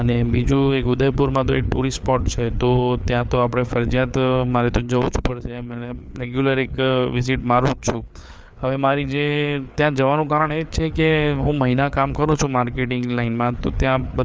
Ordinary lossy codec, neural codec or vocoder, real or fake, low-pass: none; codec, 16 kHz, 4 kbps, FreqCodec, larger model; fake; none